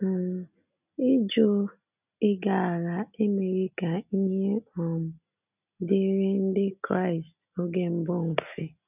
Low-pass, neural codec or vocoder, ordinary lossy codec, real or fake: 3.6 kHz; none; none; real